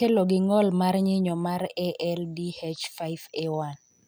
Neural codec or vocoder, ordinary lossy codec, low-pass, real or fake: none; none; none; real